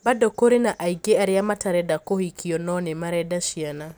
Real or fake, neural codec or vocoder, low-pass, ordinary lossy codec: real; none; none; none